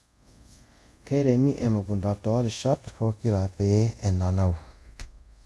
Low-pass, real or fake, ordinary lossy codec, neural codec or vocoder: none; fake; none; codec, 24 kHz, 0.5 kbps, DualCodec